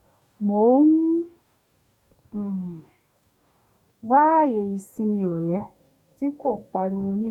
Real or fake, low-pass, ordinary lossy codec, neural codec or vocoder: fake; 19.8 kHz; none; codec, 44.1 kHz, 2.6 kbps, DAC